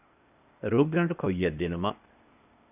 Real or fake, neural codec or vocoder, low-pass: fake; codec, 16 kHz, 0.8 kbps, ZipCodec; 3.6 kHz